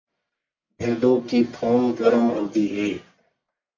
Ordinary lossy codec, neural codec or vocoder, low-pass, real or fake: MP3, 48 kbps; codec, 44.1 kHz, 1.7 kbps, Pupu-Codec; 7.2 kHz; fake